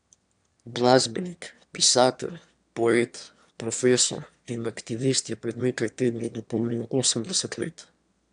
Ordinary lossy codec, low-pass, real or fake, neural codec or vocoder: none; 9.9 kHz; fake; autoencoder, 22.05 kHz, a latent of 192 numbers a frame, VITS, trained on one speaker